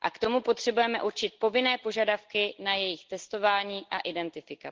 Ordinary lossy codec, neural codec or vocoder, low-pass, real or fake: Opus, 16 kbps; none; 7.2 kHz; real